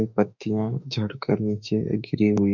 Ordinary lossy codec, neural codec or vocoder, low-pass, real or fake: MP3, 64 kbps; autoencoder, 48 kHz, 32 numbers a frame, DAC-VAE, trained on Japanese speech; 7.2 kHz; fake